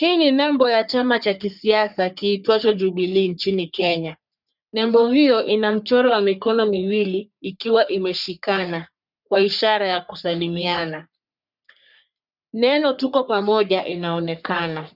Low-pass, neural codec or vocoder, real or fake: 5.4 kHz; codec, 44.1 kHz, 3.4 kbps, Pupu-Codec; fake